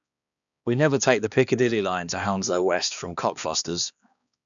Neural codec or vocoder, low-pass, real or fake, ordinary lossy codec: codec, 16 kHz, 2 kbps, X-Codec, HuBERT features, trained on balanced general audio; 7.2 kHz; fake; none